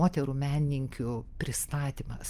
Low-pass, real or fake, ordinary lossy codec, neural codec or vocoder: 14.4 kHz; real; Opus, 32 kbps; none